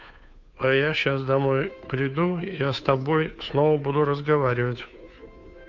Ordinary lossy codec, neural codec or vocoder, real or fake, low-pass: MP3, 64 kbps; codec, 16 kHz, 2 kbps, FunCodec, trained on Chinese and English, 25 frames a second; fake; 7.2 kHz